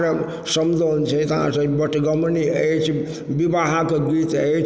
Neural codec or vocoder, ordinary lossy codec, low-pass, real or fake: none; none; none; real